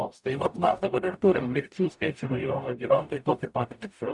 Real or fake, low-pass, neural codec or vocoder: fake; 10.8 kHz; codec, 44.1 kHz, 0.9 kbps, DAC